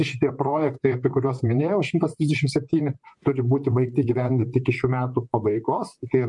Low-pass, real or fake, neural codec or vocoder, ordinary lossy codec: 10.8 kHz; fake; vocoder, 44.1 kHz, 128 mel bands, Pupu-Vocoder; MP3, 64 kbps